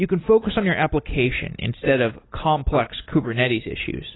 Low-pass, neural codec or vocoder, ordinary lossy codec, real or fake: 7.2 kHz; none; AAC, 16 kbps; real